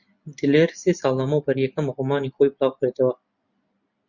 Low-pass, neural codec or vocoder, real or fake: 7.2 kHz; none; real